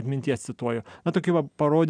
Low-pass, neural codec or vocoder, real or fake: 9.9 kHz; none; real